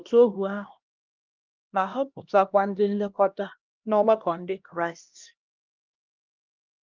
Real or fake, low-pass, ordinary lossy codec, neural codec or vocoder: fake; 7.2 kHz; Opus, 24 kbps; codec, 16 kHz, 1 kbps, X-Codec, HuBERT features, trained on LibriSpeech